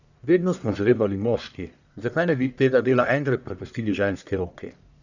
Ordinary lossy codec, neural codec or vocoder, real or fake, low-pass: none; codec, 44.1 kHz, 1.7 kbps, Pupu-Codec; fake; 7.2 kHz